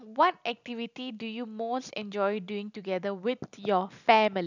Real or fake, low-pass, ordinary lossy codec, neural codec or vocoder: real; 7.2 kHz; none; none